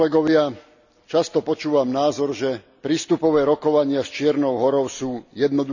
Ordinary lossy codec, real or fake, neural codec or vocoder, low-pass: none; real; none; 7.2 kHz